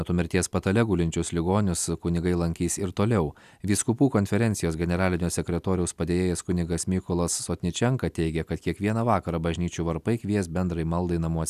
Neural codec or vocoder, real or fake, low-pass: none; real; 14.4 kHz